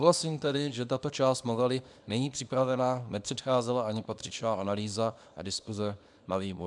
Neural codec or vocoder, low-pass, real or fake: codec, 24 kHz, 0.9 kbps, WavTokenizer, small release; 10.8 kHz; fake